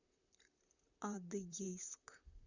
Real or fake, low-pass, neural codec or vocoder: fake; 7.2 kHz; vocoder, 44.1 kHz, 128 mel bands, Pupu-Vocoder